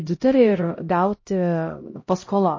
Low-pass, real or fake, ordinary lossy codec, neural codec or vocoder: 7.2 kHz; fake; MP3, 32 kbps; codec, 16 kHz, 0.5 kbps, X-Codec, HuBERT features, trained on LibriSpeech